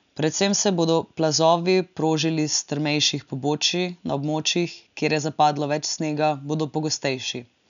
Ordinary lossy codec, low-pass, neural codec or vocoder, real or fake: none; 7.2 kHz; none; real